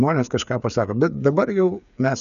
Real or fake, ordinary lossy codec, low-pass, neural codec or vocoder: fake; MP3, 96 kbps; 7.2 kHz; codec, 16 kHz, 8 kbps, FreqCodec, smaller model